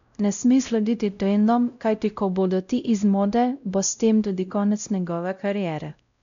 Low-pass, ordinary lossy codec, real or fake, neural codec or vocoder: 7.2 kHz; none; fake; codec, 16 kHz, 0.5 kbps, X-Codec, WavLM features, trained on Multilingual LibriSpeech